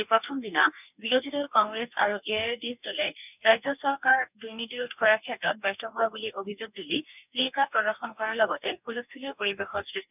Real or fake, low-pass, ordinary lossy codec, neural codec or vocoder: fake; 3.6 kHz; none; codec, 44.1 kHz, 2.6 kbps, DAC